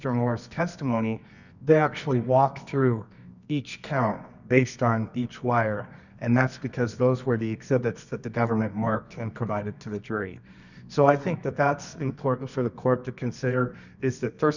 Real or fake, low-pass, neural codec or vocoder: fake; 7.2 kHz; codec, 24 kHz, 0.9 kbps, WavTokenizer, medium music audio release